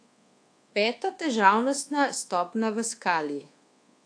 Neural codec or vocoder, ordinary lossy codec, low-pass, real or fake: codec, 24 kHz, 1.2 kbps, DualCodec; AAC, 48 kbps; 9.9 kHz; fake